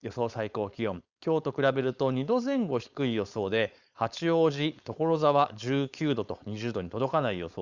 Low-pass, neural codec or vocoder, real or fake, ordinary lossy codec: 7.2 kHz; codec, 16 kHz, 4.8 kbps, FACodec; fake; Opus, 64 kbps